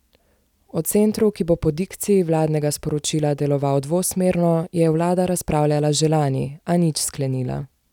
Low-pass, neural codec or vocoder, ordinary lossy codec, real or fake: 19.8 kHz; none; none; real